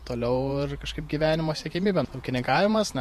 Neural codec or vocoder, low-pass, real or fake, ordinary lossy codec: vocoder, 48 kHz, 128 mel bands, Vocos; 14.4 kHz; fake; MP3, 64 kbps